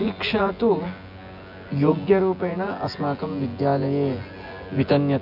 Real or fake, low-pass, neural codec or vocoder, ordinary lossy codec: fake; 5.4 kHz; vocoder, 24 kHz, 100 mel bands, Vocos; none